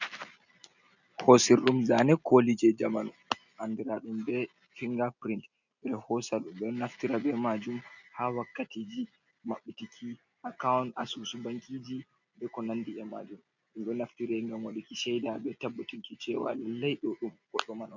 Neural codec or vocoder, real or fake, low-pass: none; real; 7.2 kHz